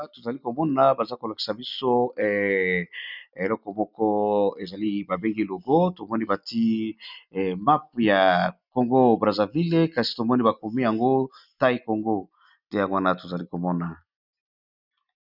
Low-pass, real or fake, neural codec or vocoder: 5.4 kHz; real; none